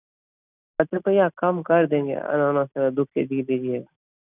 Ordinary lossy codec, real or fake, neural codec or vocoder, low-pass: none; real; none; 3.6 kHz